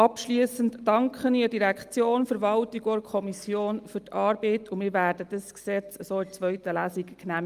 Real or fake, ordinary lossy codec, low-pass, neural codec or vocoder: real; Opus, 32 kbps; 14.4 kHz; none